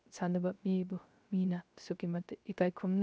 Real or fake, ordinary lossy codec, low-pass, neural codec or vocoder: fake; none; none; codec, 16 kHz, 0.3 kbps, FocalCodec